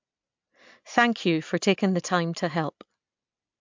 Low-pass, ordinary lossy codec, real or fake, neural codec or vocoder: 7.2 kHz; MP3, 64 kbps; real; none